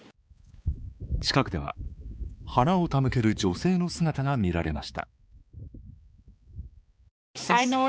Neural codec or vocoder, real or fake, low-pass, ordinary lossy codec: codec, 16 kHz, 4 kbps, X-Codec, HuBERT features, trained on balanced general audio; fake; none; none